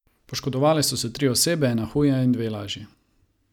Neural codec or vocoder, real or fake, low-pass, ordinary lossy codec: none; real; 19.8 kHz; none